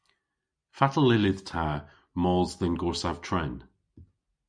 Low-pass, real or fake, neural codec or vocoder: 9.9 kHz; real; none